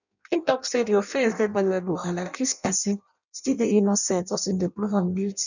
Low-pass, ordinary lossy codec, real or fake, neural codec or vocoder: 7.2 kHz; none; fake; codec, 16 kHz in and 24 kHz out, 0.6 kbps, FireRedTTS-2 codec